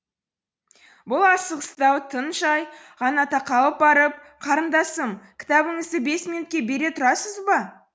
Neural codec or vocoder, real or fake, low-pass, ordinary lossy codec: none; real; none; none